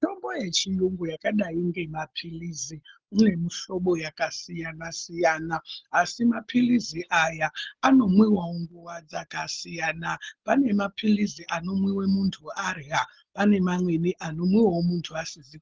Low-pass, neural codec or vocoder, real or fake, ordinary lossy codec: 7.2 kHz; none; real; Opus, 16 kbps